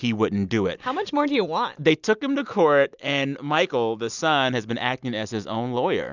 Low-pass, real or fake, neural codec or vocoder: 7.2 kHz; real; none